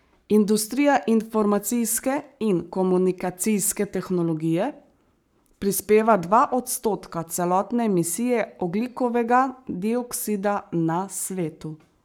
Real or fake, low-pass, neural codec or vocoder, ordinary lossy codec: fake; none; codec, 44.1 kHz, 7.8 kbps, Pupu-Codec; none